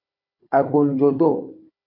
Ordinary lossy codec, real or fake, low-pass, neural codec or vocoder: MP3, 32 kbps; fake; 5.4 kHz; codec, 16 kHz, 4 kbps, FunCodec, trained on Chinese and English, 50 frames a second